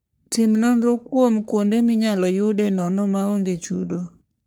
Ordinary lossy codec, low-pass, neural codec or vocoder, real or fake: none; none; codec, 44.1 kHz, 3.4 kbps, Pupu-Codec; fake